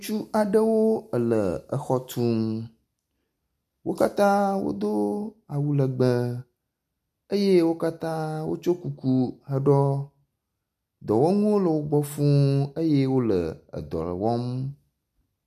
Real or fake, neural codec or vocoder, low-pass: real; none; 14.4 kHz